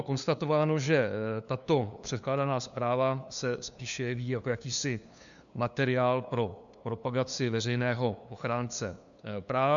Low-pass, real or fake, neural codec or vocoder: 7.2 kHz; fake; codec, 16 kHz, 2 kbps, FunCodec, trained on LibriTTS, 25 frames a second